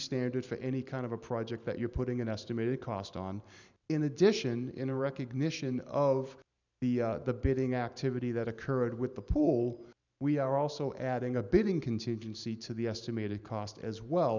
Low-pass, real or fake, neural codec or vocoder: 7.2 kHz; fake; vocoder, 44.1 kHz, 128 mel bands every 512 samples, BigVGAN v2